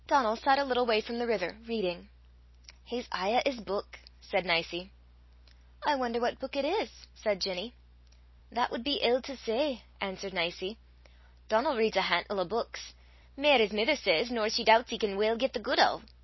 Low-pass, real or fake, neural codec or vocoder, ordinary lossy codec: 7.2 kHz; real; none; MP3, 24 kbps